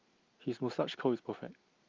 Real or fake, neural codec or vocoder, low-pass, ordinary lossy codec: real; none; 7.2 kHz; Opus, 24 kbps